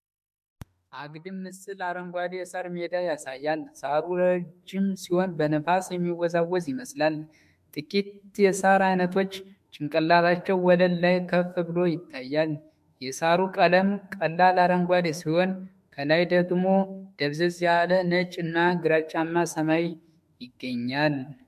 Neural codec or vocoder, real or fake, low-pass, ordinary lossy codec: autoencoder, 48 kHz, 32 numbers a frame, DAC-VAE, trained on Japanese speech; fake; 14.4 kHz; MP3, 64 kbps